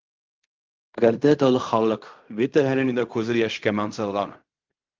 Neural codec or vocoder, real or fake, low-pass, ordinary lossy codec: codec, 16 kHz in and 24 kHz out, 0.4 kbps, LongCat-Audio-Codec, fine tuned four codebook decoder; fake; 7.2 kHz; Opus, 24 kbps